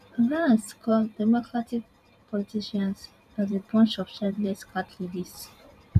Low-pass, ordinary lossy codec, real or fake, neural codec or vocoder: 14.4 kHz; none; fake; vocoder, 44.1 kHz, 128 mel bands every 512 samples, BigVGAN v2